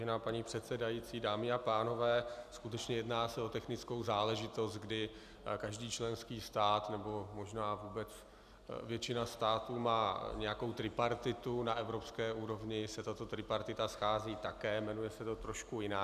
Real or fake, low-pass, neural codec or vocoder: real; 14.4 kHz; none